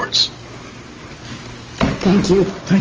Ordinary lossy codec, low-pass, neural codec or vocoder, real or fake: Opus, 24 kbps; 7.2 kHz; none; real